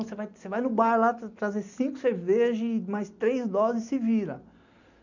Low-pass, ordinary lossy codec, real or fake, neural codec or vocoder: 7.2 kHz; none; real; none